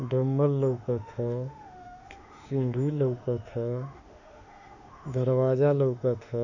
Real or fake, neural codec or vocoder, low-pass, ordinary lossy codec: fake; autoencoder, 48 kHz, 32 numbers a frame, DAC-VAE, trained on Japanese speech; 7.2 kHz; none